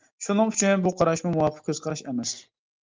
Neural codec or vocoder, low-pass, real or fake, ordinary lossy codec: none; 7.2 kHz; real; Opus, 32 kbps